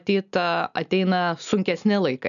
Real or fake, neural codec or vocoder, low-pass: real; none; 7.2 kHz